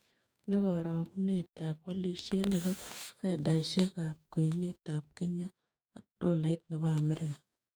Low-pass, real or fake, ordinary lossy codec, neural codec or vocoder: none; fake; none; codec, 44.1 kHz, 2.6 kbps, DAC